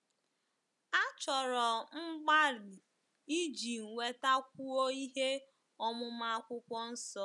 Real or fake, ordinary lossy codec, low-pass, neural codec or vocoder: real; none; 10.8 kHz; none